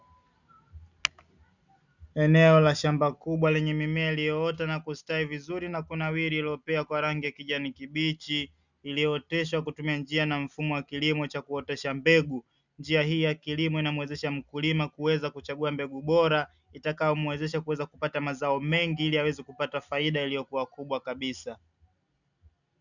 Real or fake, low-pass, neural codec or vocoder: real; 7.2 kHz; none